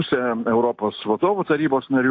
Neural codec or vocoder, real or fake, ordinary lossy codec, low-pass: none; real; AAC, 48 kbps; 7.2 kHz